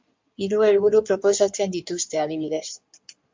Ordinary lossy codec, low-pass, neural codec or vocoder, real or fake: MP3, 64 kbps; 7.2 kHz; codec, 16 kHz in and 24 kHz out, 2.2 kbps, FireRedTTS-2 codec; fake